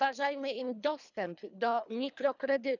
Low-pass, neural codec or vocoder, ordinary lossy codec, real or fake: 7.2 kHz; codec, 24 kHz, 3 kbps, HILCodec; none; fake